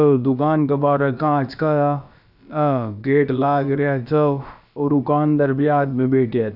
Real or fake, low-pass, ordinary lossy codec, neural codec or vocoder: fake; 5.4 kHz; none; codec, 16 kHz, about 1 kbps, DyCAST, with the encoder's durations